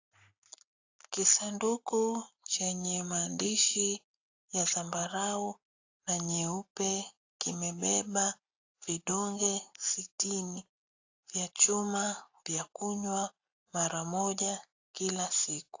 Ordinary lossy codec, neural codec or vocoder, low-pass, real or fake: AAC, 48 kbps; none; 7.2 kHz; real